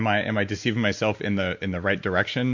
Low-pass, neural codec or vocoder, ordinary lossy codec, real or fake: 7.2 kHz; none; MP3, 48 kbps; real